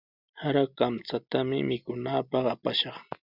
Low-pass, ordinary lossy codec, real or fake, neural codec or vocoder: 5.4 kHz; Opus, 64 kbps; real; none